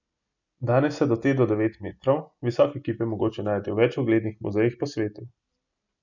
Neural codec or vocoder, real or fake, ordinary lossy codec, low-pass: none; real; none; 7.2 kHz